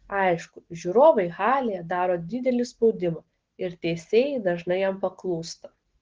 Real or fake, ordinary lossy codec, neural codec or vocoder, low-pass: real; Opus, 16 kbps; none; 7.2 kHz